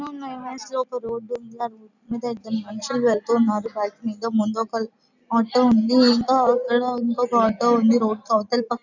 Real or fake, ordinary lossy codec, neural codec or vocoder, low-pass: real; none; none; 7.2 kHz